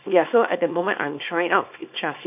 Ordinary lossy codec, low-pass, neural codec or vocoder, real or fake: none; 3.6 kHz; codec, 24 kHz, 0.9 kbps, WavTokenizer, small release; fake